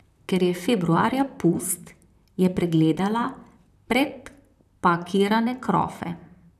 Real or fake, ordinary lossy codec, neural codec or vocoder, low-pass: fake; none; vocoder, 44.1 kHz, 128 mel bands, Pupu-Vocoder; 14.4 kHz